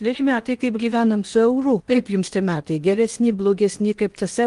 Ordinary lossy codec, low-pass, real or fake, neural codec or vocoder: Opus, 32 kbps; 10.8 kHz; fake; codec, 16 kHz in and 24 kHz out, 0.8 kbps, FocalCodec, streaming, 65536 codes